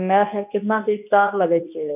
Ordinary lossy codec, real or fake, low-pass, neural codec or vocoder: none; fake; 3.6 kHz; codec, 24 kHz, 0.9 kbps, WavTokenizer, medium speech release version 2